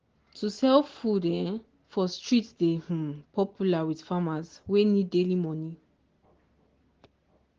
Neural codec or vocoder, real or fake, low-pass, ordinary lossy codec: none; real; 7.2 kHz; Opus, 16 kbps